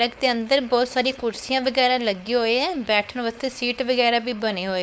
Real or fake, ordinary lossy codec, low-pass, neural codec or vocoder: fake; none; none; codec, 16 kHz, 4.8 kbps, FACodec